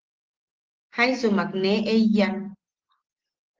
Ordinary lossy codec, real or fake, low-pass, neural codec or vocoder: Opus, 16 kbps; real; 7.2 kHz; none